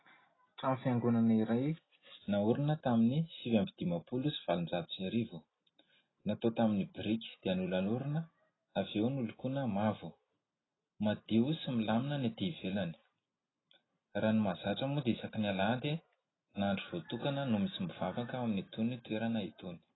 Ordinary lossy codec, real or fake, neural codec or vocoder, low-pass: AAC, 16 kbps; real; none; 7.2 kHz